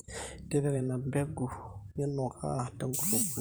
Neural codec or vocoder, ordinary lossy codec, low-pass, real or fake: vocoder, 44.1 kHz, 128 mel bands every 512 samples, BigVGAN v2; none; none; fake